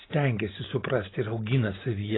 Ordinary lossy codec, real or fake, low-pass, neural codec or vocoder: AAC, 16 kbps; real; 7.2 kHz; none